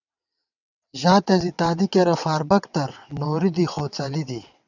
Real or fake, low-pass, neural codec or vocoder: fake; 7.2 kHz; vocoder, 22.05 kHz, 80 mel bands, WaveNeXt